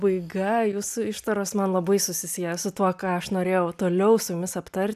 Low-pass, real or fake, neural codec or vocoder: 14.4 kHz; real; none